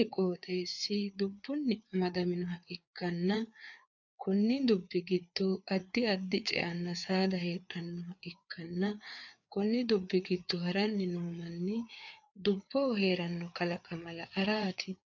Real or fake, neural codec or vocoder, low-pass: fake; codec, 16 kHz, 4 kbps, FreqCodec, larger model; 7.2 kHz